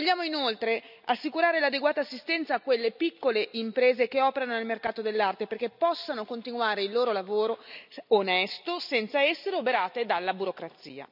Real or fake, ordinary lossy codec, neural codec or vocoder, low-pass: real; none; none; 5.4 kHz